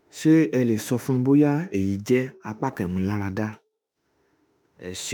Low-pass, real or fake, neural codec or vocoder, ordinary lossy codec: none; fake; autoencoder, 48 kHz, 32 numbers a frame, DAC-VAE, trained on Japanese speech; none